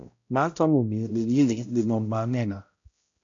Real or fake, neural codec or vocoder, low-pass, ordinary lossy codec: fake; codec, 16 kHz, 0.5 kbps, X-Codec, HuBERT features, trained on balanced general audio; 7.2 kHz; AAC, 48 kbps